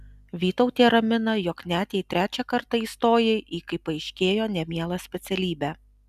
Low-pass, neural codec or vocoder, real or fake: 14.4 kHz; none; real